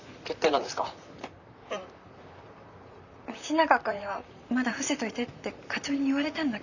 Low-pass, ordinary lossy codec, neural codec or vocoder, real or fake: 7.2 kHz; none; vocoder, 44.1 kHz, 128 mel bands, Pupu-Vocoder; fake